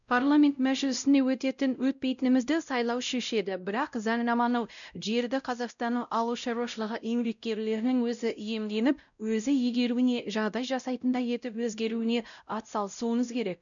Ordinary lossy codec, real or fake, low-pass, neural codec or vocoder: none; fake; 7.2 kHz; codec, 16 kHz, 0.5 kbps, X-Codec, WavLM features, trained on Multilingual LibriSpeech